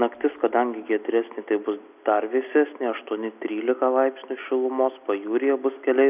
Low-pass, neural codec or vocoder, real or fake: 3.6 kHz; none; real